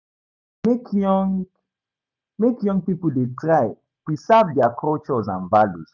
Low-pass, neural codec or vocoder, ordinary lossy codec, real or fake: 7.2 kHz; none; none; real